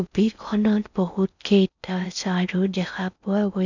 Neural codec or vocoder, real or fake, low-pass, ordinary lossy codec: codec, 16 kHz in and 24 kHz out, 0.6 kbps, FocalCodec, streaming, 4096 codes; fake; 7.2 kHz; none